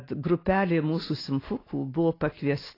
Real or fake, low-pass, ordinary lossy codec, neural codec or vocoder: real; 5.4 kHz; AAC, 24 kbps; none